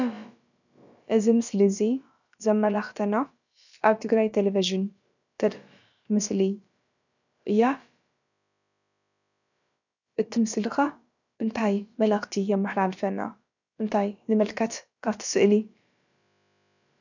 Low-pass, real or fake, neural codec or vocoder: 7.2 kHz; fake; codec, 16 kHz, about 1 kbps, DyCAST, with the encoder's durations